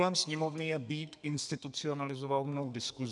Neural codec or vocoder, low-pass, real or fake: codec, 32 kHz, 1.9 kbps, SNAC; 10.8 kHz; fake